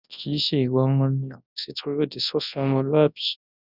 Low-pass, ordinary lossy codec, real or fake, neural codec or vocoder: 5.4 kHz; none; fake; codec, 24 kHz, 0.9 kbps, WavTokenizer, large speech release